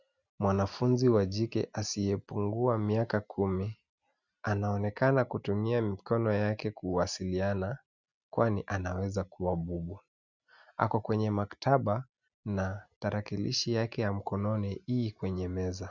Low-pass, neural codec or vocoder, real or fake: 7.2 kHz; none; real